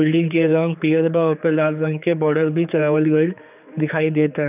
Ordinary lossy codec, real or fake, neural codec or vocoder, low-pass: none; fake; codec, 16 kHz, 4 kbps, X-Codec, HuBERT features, trained on general audio; 3.6 kHz